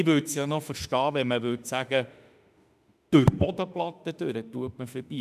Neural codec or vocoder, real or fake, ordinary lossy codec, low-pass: autoencoder, 48 kHz, 32 numbers a frame, DAC-VAE, trained on Japanese speech; fake; none; 14.4 kHz